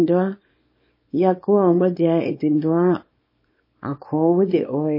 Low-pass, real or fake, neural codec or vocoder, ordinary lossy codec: 5.4 kHz; fake; codec, 24 kHz, 0.9 kbps, WavTokenizer, small release; MP3, 24 kbps